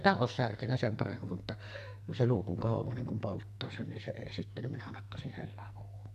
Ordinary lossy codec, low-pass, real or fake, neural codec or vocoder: AAC, 96 kbps; 14.4 kHz; fake; codec, 44.1 kHz, 2.6 kbps, SNAC